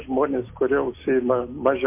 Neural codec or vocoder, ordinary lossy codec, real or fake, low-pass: none; MP3, 24 kbps; real; 3.6 kHz